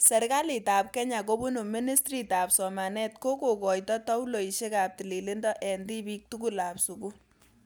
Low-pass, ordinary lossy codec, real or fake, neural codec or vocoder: none; none; real; none